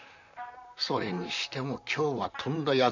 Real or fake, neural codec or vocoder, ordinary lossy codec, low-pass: fake; vocoder, 44.1 kHz, 128 mel bands, Pupu-Vocoder; none; 7.2 kHz